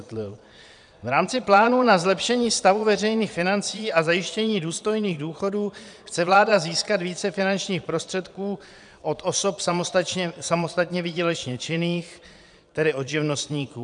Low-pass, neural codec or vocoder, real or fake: 9.9 kHz; vocoder, 22.05 kHz, 80 mel bands, Vocos; fake